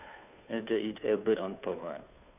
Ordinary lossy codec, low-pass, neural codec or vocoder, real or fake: none; 3.6 kHz; codec, 16 kHz, 2 kbps, FunCodec, trained on Chinese and English, 25 frames a second; fake